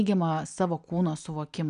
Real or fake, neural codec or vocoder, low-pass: real; none; 9.9 kHz